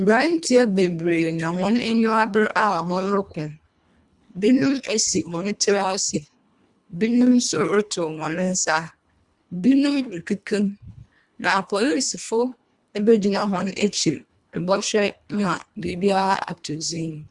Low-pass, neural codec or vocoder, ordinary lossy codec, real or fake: 10.8 kHz; codec, 24 kHz, 1.5 kbps, HILCodec; Opus, 64 kbps; fake